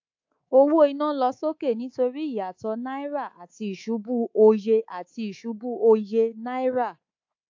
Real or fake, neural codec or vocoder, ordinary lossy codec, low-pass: fake; codec, 24 kHz, 3.1 kbps, DualCodec; AAC, 48 kbps; 7.2 kHz